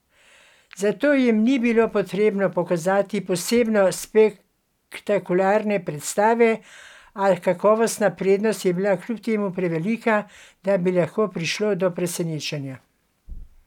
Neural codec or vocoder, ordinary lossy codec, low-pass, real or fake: none; none; 19.8 kHz; real